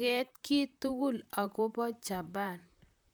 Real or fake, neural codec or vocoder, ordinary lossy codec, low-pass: fake; vocoder, 44.1 kHz, 128 mel bands, Pupu-Vocoder; none; none